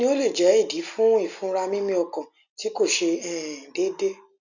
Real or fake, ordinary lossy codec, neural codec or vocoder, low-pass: real; none; none; 7.2 kHz